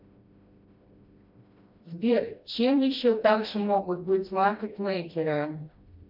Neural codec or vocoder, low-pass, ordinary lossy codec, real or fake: codec, 16 kHz, 1 kbps, FreqCodec, smaller model; 5.4 kHz; MP3, 48 kbps; fake